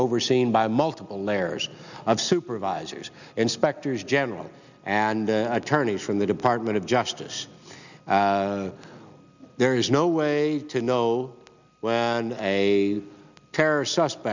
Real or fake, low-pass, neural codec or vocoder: real; 7.2 kHz; none